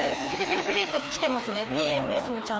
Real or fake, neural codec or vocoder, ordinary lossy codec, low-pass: fake; codec, 16 kHz, 2 kbps, FreqCodec, larger model; none; none